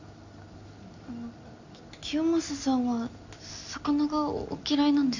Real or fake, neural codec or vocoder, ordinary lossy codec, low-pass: real; none; Opus, 64 kbps; 7.2 kHz